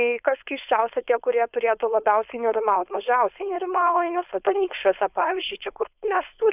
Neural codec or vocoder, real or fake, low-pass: codec, 16 kHz, 4.8 kbps, FACodec; fake; 3.6 kHz